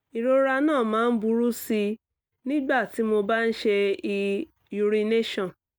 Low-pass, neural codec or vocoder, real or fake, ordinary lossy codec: none; none; real; none